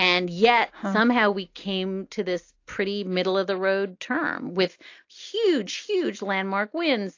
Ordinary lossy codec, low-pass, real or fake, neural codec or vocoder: AAC, 48 kbps; 7.2 kHz; real; none